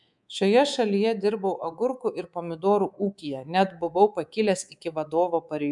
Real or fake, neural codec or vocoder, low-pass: fake; codec, 24 kHz, 3.1 kbps, DualCodec; 10.8 kHz